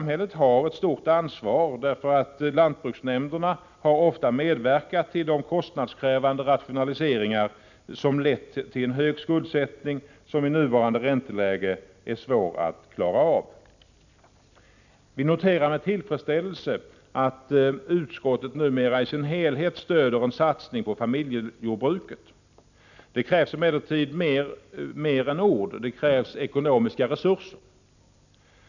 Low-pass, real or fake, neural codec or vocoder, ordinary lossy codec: 7.2 kHz; real; none; none